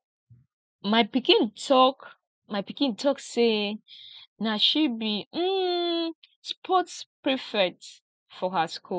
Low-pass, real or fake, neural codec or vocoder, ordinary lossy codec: none; real; none; none